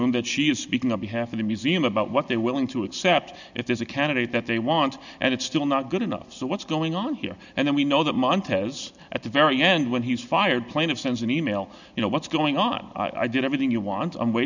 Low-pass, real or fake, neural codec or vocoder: 7.2 kHz; real; none